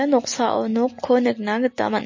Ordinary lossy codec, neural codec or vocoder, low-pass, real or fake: MP3, 32 kbps; none; 7.2 kHz; real